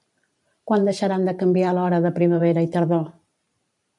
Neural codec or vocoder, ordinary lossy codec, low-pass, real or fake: vocoder, 44.1 kHz, 128 mel bands every 512 samples, BigVGAN v2; MP3, 96 kbps; 10.8 kHz; fake